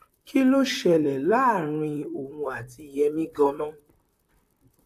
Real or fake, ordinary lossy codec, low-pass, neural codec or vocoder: fake; AAC, 64 kbps; 14.4 kHz; vocoder, 44.1 kHz, 128 mel bands, Pupu-Vocoder